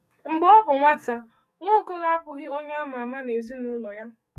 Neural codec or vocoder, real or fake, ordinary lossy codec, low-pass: codec, 44.1 kHz, 2.6 kbps, SNAC; fake; none; 14.4 kHz